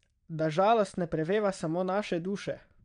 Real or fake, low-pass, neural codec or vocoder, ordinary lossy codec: fake; 9.9 kHz; vocoder, 22.05 kHz, 80 mel bands, Vocos; none